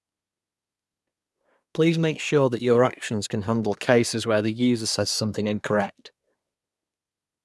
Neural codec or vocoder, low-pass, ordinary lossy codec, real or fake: codec, 24 kHz, 1 kbps, SNAC; none; none; fake